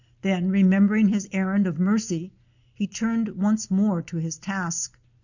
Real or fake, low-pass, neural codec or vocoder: real; 7.2 kHz; none